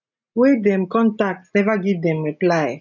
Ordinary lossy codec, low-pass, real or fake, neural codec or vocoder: none; 7.2 kHz; real; none